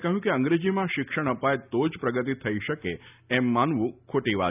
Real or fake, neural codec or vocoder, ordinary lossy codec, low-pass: real; none; none; 3.6 kHz